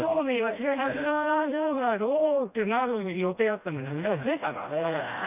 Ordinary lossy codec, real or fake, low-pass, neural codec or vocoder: none; fake; 3.6 kHz; codec, 16 kHz, 1 kbps, FreqCodec, smaller model